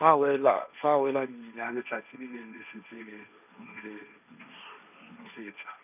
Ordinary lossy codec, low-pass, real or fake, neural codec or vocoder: none; 3.6 kHz; fake; codec, 16 kHz, 1.1 kbps, Voila-Tokenizer